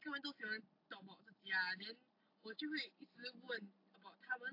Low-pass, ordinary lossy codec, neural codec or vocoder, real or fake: 5.4 kHz; AAC, 32 kbps; none; real